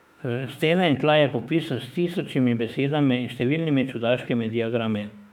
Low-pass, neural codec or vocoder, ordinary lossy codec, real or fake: 19.8 kHz; autoencoder, 48 kHz, 32 numbers a frame, DAC-VAE, trained on Japanese speech; none; fake